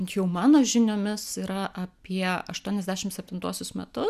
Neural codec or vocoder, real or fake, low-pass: none; real; 14.4 kHz